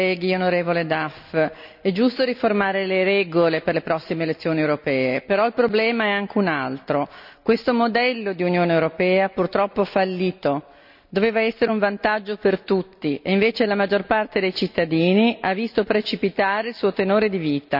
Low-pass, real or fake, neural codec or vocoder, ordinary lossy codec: 5.4 kHz; real; none; none